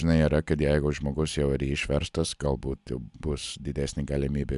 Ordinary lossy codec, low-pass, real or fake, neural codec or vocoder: MP3, 96 kbps; 10.8 kHz; real; none